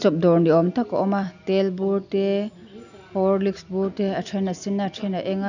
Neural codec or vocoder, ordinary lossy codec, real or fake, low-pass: none; none; real; 7.2 kHz